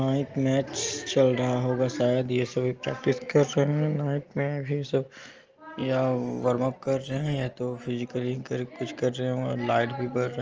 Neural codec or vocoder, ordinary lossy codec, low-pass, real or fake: none; Opus, 24 kbps; 7.2 kHz; real